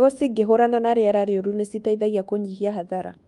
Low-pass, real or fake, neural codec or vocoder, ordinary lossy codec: 10.8 kHz; fake; codec, 24 kHz, 1.2 kbps, DualCodec; Opus, 32 kbps